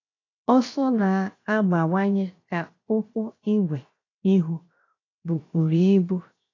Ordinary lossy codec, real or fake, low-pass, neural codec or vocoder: AAC, 32 kbps; fake; 7.2 kHz; codec, 16 kHz, 0.7 kbps, FocalCodec